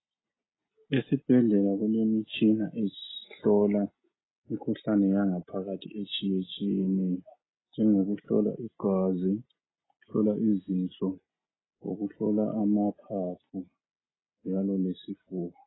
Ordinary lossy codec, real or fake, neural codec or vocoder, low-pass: AAC, 16 kbps; real; none; 7.2 kHz